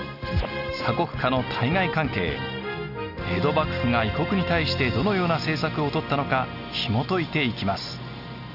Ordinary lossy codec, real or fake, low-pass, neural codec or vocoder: none; real; 5.4 kHz; none